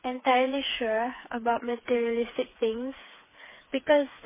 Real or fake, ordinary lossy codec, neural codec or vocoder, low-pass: fake; MP3, 16 kbps; codec, 16 kHz, 4 kbps, FreqCodec, smaller model; 3.6 kHz